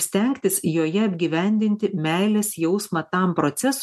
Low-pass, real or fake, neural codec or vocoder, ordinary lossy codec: 14.4 kHz; real; none; MP3, 64 kbps